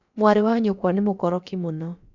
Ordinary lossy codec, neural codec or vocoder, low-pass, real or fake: none; codec, 16 kHz, about 1 kbps, DyCAST, with the encoder's durations; 7.2 kHz; fake